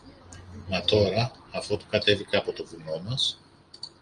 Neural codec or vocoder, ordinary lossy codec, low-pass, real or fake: none; Opus, 32 kbps; 9.9 kHz; real